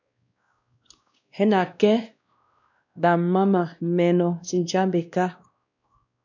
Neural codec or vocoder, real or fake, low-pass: codec, 16 kHz, 1 kbps, X-Codec, WavLM features, trained on Multilingual LibriSpeech; fake; 7.2 kHz